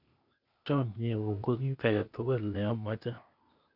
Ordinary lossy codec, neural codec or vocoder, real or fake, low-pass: none; codec, 16 kHz, 0.8 kbps, ZipCodec; fake; 5.4 kHz